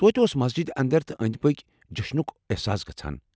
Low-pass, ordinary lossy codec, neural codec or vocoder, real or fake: none; none; none; real